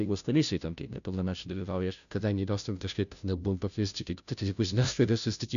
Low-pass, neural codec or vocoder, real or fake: 7.2 kHz; codec, 16 kHz, 0.5 kbps, FunCodec, trained on Chinese and English, 25 frames a second; fake